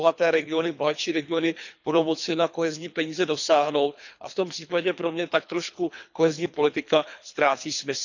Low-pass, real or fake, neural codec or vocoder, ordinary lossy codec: 7.2 kHz; fake; codec, 24 kHz, 3 kbps, HILCodec; none